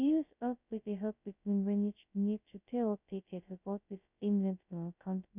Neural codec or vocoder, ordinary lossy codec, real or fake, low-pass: codec, 16 kHz, 0.2 kbps, FocalCodec; none; fake; 3.6 kHz